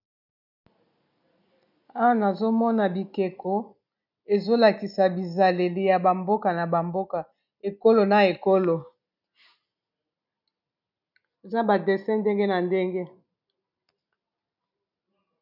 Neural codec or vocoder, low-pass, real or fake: none; 5.4 kHz; real